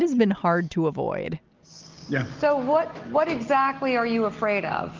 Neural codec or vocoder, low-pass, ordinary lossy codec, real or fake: none; 7.2 kHz; Opus, 24 kbps; real